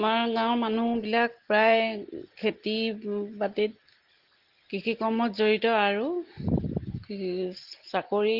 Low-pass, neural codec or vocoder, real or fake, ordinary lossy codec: 5.4 kHz; none; real; Opus, 16 kbps